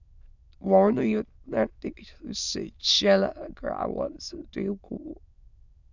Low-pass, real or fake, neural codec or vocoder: 7.2 kHz; fake; autoencoder, 22.05 kHz, a latent of 192 numbers a frame, VITS, trained on many speakers